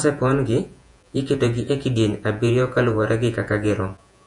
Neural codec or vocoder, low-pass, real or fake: vocoder, 48 kHz, 128 mel bands, Vocos; 10.8 kHz; fake